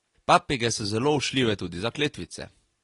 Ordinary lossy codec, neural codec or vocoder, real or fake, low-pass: AAC, 32 kbps; none; real; 10.8 kHz